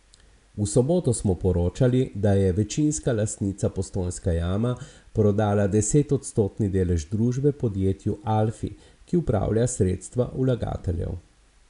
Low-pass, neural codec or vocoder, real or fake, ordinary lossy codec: 10.8 kHz; none; real; none